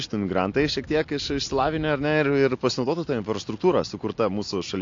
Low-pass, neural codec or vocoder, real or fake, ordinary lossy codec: 7.2 kHz; none; real; AAC, 48 kbps